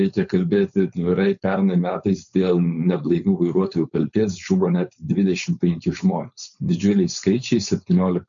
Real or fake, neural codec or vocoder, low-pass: fake; codec, 16 kHz, 4.8 kbps, FACodec; 7.2 kHz